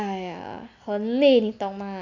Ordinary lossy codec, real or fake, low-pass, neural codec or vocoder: none; real; 7.2 kHz; none